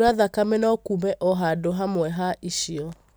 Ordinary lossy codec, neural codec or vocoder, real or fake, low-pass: none; none; real; none